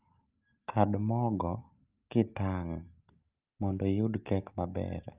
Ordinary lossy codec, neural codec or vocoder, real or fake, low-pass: Opus, 24 kbps; none; real; 3.6 kHz